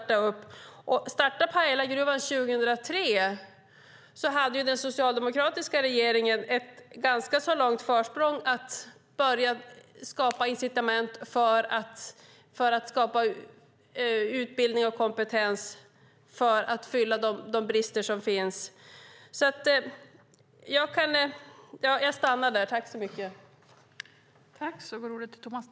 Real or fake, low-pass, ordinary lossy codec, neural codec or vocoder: real; none; none; none